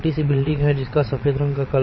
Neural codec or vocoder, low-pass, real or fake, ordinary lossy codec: vocoder, 22.05 kHz, 80 mel bands, Vocos; 7.2 kHz; fake; MP3, 24 kbps